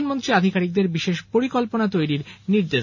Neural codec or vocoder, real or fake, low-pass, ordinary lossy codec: none; real; 7.2 kHz; none